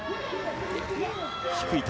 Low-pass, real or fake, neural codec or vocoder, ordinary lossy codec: none; real; none; none